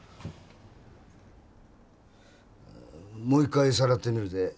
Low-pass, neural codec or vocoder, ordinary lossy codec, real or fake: none; none; none; real